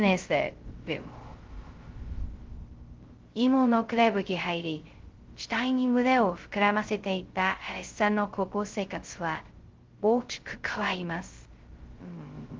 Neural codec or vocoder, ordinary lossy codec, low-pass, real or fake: codec, 16 kHz, 0.2 kbps, FocalCodec; Opus, 16 kbps; 7.2 kHz; fake